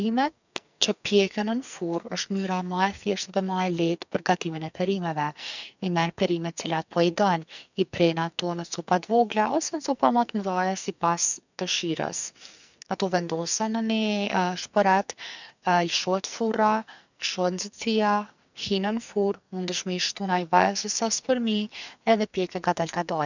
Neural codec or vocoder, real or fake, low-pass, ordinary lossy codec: codec, 44.1 kHz, 2.6 kbps, SNAC; fake; 7.2 kHz; none